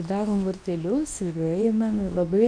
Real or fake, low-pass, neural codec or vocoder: fake; 9.9 kHz; codec, 24 kHz, 0.9 kbps, WavTokenizer, medium speech release version 2